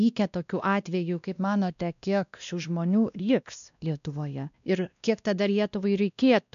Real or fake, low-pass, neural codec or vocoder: fake; 7.2 kHz; codec, 16 kHz, 1 kbps, X-Codec, WavLM features, trained on Multilingual LibriSpeech